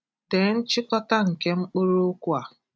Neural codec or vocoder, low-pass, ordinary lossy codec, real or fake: none; none; none; real